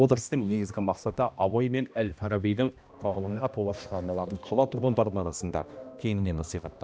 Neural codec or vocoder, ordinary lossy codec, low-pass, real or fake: codec, 16 kHz, 1 kbps, X-Codec, HuBERT features, trained on balanced general audio; none; none; fake